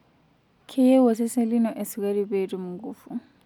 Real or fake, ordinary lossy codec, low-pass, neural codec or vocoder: real; none; 19.8 kHz; none